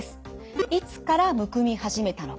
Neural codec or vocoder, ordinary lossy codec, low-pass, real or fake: none; none; none; real